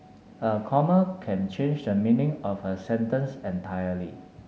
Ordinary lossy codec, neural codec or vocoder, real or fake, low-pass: none; none; real; none